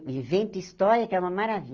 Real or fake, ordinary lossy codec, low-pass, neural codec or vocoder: real; Opus, 32 kbps; 7.2 kHz; none